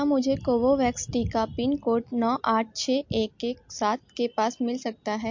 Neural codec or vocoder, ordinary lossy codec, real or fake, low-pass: none; MP3, 48 kbps; real; 7.2 kHz